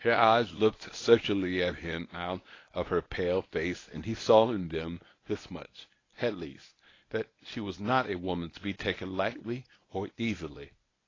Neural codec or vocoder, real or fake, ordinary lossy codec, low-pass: codec, 24 kHz, 0.9 kbps, WavTokenizer, medium speech release version 1; fake; AAC, 32 kbps; 7.2 kHz